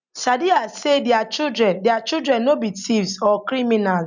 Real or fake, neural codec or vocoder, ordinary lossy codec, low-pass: real; none; none; 7.2 kHz